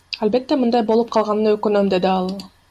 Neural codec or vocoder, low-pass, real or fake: none; 14.4 kHz; real